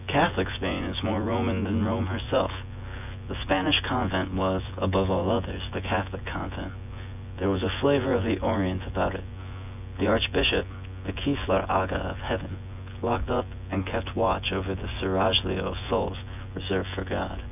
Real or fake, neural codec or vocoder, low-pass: fake; vocoder, 24 kHz, 100 mel bands, Vocos; 3.6 kHz